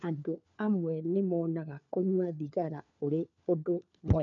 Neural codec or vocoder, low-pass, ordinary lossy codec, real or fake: codec, 16 kHz, 4 kbps, FunCodec, trained on LibriTTS, 50 frames a second; 7.2 kHz; MP3, 64 kbps; fake